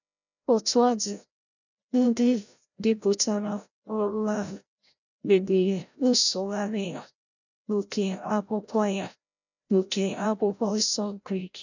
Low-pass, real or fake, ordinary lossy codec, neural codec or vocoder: 7.2 kHz; fake; none; codec, 16 kHz, 0.5 kbps, FreqCodec, larger model